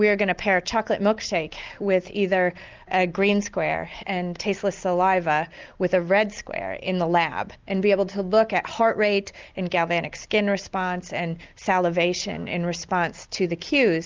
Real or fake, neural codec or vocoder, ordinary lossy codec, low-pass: real; none; Opus, 32 kbps; 7.2 kHz